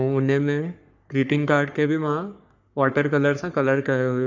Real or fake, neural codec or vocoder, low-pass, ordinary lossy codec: fake; codec, 44.1 kHz, 3.4 kbps, Pupu-Codec; 7.2 kHz; none